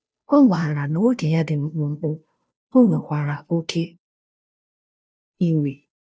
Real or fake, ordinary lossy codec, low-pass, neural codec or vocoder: fake; none; none; codec, 16 kHz, 0.5 kbps, FunCodec, trained on Chinese and English, 25 frames a second